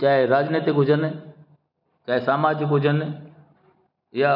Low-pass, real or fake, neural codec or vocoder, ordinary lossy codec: 5.4 kHz; real; none; none